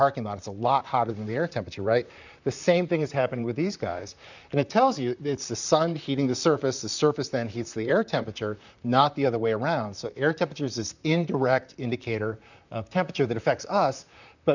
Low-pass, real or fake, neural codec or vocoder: 7.2 kHz; fake; vocoder, 44.1 kHz, 128 mel bands, Pupu-Vocoder